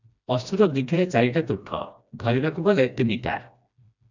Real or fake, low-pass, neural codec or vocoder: fake; 7.2 kHz; codec, 16 kHz, 1 kbps, FreqCodec, smaller model